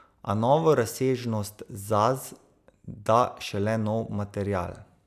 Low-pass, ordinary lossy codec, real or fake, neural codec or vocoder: 14.4 kHz; none; real; none